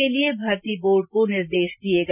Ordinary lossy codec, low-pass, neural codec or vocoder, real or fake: none; 3.6 kHz; none; real